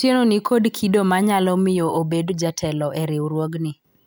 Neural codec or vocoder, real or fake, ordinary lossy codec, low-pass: none; real; none; none